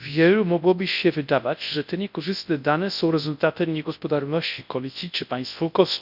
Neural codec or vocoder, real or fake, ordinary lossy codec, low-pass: codec, 24 kHz, 0.9 kbps, WavTokenizer, large speech release; fake; none; 5.4 kHz